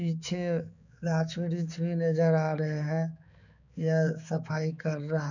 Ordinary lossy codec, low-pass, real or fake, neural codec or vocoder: none; 7.2 kHz; fake; codec, 16 kHz, 4 kbps, X-Codec, HuBERT features, trained on balanced general audio